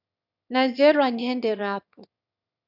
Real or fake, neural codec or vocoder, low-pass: fake; autoencoder, 22.05 kHz, a latent of 192 numbers a frame, VITS, trained on one speaker; 5.4 kHz